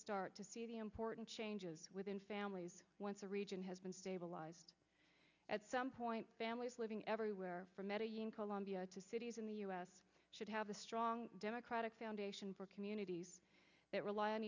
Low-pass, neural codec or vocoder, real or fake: 7.2 kHz; none; real